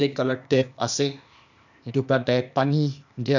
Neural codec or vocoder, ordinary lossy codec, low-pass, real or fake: codec, 16 kHz, 0.8 kbps, ZipCodec; none; 7.2 kHz; fake